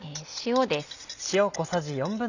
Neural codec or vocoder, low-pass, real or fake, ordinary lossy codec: none; 7.2 kHz; real; none